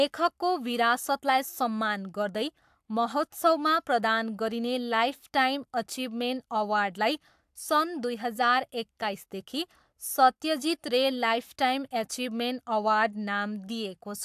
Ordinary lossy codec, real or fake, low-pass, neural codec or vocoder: AAC, 96 kbps; fake; 14.4 kHz; codec, 44.1 kHz, 7.8 kbps, Pupu-Codec